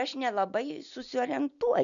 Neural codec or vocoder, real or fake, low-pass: none; real; 7.2 kHz